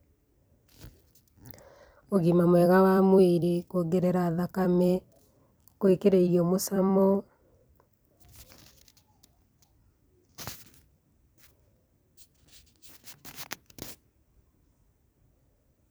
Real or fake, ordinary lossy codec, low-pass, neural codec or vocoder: fake; none; none; vocoder, 44.1 kHz, 128 mel bands every 256 samples, BigVGAN v2